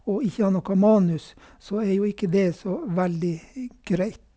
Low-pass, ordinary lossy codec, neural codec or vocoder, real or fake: none; none; none; real